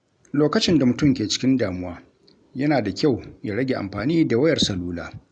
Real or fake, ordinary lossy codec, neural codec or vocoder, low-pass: real; none; none; 9.9 kHz